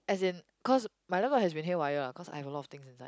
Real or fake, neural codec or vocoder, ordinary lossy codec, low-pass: real; none; none; none